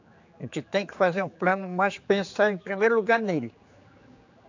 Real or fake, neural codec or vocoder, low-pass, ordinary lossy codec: fake; codec, 16 kHz, 4 kbps, X-Codec, HuBERT features, trained on general audio; 7.2 kHz; AAC, 48 kbps